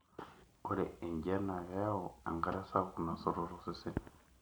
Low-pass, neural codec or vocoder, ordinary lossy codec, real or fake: none; none; none; real